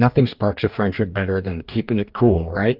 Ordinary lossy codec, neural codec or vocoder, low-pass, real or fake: Opus, 24 kbps; codec, 44.1 kHz, 2.6 kbps, DAC; 5.4 kHz; fake